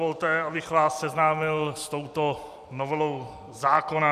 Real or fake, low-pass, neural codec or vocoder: fake; 14.4 kHz; vocoder, 44.1 kHz, 128 mel bands every 256 samples, BigVGAN v2